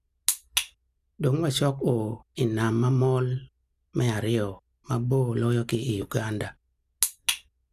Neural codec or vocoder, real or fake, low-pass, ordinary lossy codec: none; real; 14.4 kHz; none